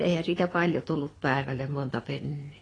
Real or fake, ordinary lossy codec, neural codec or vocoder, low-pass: fake; AAC, 32 kbps; codec, 24 kHz, 6 kbps, HILCodec; 9.9 kHz